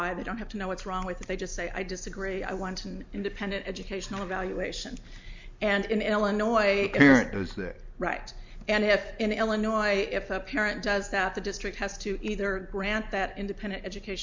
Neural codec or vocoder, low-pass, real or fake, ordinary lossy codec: none; 7.2 kHz; real; MP3, 64 kbps